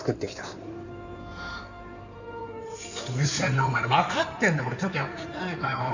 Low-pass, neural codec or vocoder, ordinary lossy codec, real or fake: 7.2 kHz; codec, 16 kHz in and 24 kHz out, 2.2 kbps, FireRedTTS-2 codec; none; fake